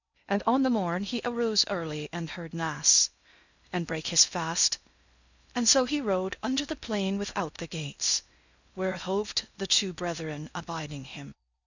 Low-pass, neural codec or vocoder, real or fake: 7.2 kHz; codec, 16 kHz in and 24 kHz out, 0.6 kbps, FocalCodec, streaming, 2048 codes; fake